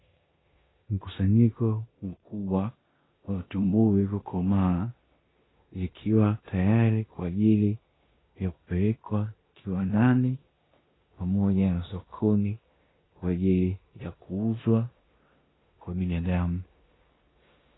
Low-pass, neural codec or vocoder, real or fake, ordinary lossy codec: 7.2 kHz; codec, 16 kHz in and 24 kHz out, 0.9 kbps, LongCat-Audio-Codec, four codebook decoder; fake; AAC, 16 kbps